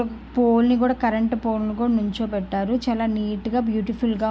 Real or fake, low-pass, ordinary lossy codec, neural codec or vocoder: real; none; none; none